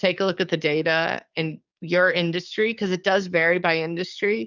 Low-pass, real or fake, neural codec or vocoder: 7.2 kHz; fake; codec, 44.1 kHz, 7.8 kbps, DAC